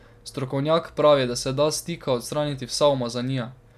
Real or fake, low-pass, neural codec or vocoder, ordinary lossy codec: real; 14.4 kHz; none; none